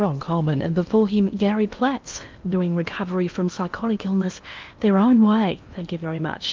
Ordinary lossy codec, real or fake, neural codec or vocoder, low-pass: Opus, 24 kbps; fake; codec, 16 kHz in and 24 kHz out, 0.8 kbps, FocalCodec, streaming, 65536 codes; 7.2 kHz